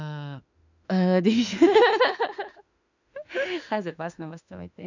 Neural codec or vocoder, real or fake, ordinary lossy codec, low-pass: autoencoder, 48 kHz, 32 numbers a frame, DAC-VAE, trained on Japanese speech; fake; none; 7.2 kHz